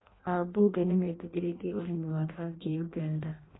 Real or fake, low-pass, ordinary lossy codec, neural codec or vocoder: fake; 7.2 kHz; AAC, 16 kbps; codec, 16 kHz in and 24 kHz out, 0.6 kbps, FireRedTTS-2 codec